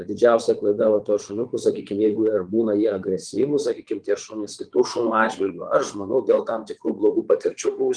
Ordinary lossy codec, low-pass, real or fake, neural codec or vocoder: Opus, 24 kbps; 9.9 kHz; fake; vocoder, 22.05 kHz, 80 mel bands, Vocos